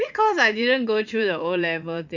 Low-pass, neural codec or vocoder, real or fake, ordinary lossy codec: 7.2 kHz; vocoder, 44.1 kHz, 80 mel bands, Vocos; fake; none